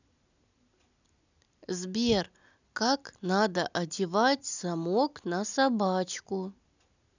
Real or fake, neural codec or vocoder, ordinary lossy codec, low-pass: real; none; none; 7.2 kHz